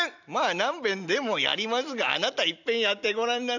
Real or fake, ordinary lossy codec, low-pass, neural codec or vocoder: real; none; 7.2 kHz; none